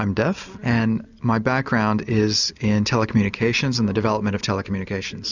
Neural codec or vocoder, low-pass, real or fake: none; 7.2 kHz; real